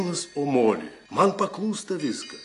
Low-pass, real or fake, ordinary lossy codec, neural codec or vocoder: 10.8 kHz; real; AAC, 48 kbps; none